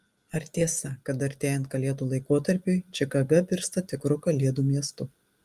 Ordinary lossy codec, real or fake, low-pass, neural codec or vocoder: Opus, 32 kbps; real; 14.4 kHz; none